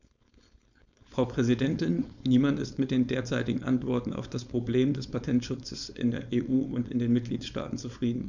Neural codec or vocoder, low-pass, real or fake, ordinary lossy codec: codec, 16 kHz, 4.8 kbps, FACodec; 7.2 kHz; fake; none